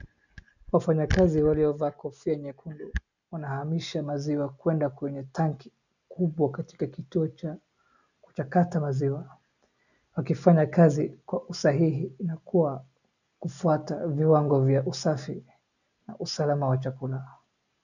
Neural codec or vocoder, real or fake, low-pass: none; real; 7.2 kHz